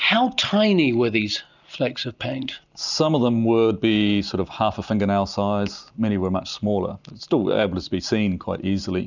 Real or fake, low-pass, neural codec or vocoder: real; 7.2 kHz; none